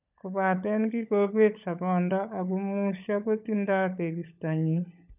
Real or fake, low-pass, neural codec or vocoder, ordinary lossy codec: fake; 3.6 kHz; codec, 16 kHz, 16 kbps, FunCodec, trained on LibriTTS, 50 frames a second; none